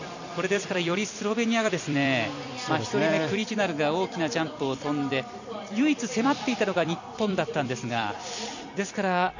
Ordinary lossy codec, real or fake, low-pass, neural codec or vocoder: none; fake; 7.2 kHz; vocoder, 44.1 kHz, 128 mel bands every 256 samples, BigVGAN v2